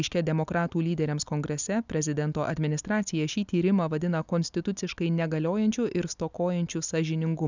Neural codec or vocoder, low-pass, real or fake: none; 7.2 kHz; real